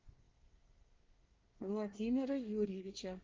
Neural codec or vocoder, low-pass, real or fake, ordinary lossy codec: codec, 24 kHz, 1 kbps, SNAC; 7.2 kHz; fake; Opus, 24 kbps